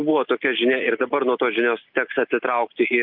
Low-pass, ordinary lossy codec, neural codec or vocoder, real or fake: 5.4 kHz; Opus, 24 kbps; none; real